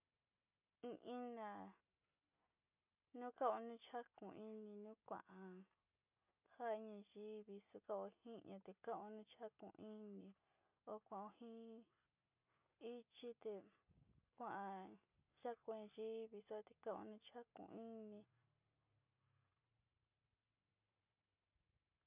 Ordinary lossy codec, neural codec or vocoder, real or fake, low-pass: none; none; real; 3.6 kHz